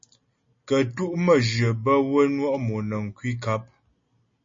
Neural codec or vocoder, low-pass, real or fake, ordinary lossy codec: none; 7.2 kHz; real; MP3, 32 kbps